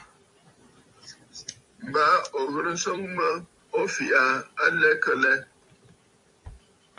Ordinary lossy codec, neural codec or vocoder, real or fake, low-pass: MP3, 48 kbps; vocoder, 44.1 kHz, 128 mel bands every 256 samples, BigVGAN v2; fake; 10.8 kHz